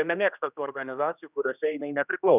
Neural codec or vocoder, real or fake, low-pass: codec, 16 kHz, 1 kbps, X-Codec, HuBERT features, trained on general audio; fake; 3.6 kHz